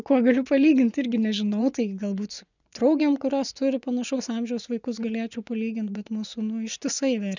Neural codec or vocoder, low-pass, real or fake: vocoder, 24 kHz, 100 mel bands, Vocos; 7.2 kHz; fake